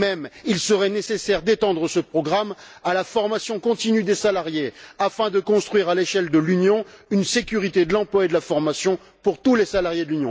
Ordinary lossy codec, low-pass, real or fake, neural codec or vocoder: none; none; real; none